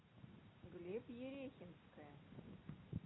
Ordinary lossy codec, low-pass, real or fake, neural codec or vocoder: AAC, 16 kbps; 7.2 kHz; real; none